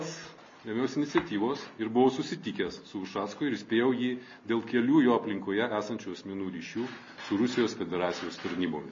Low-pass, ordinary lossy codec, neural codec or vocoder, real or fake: 7.2 kHz; MP3, 32 kbps; none; real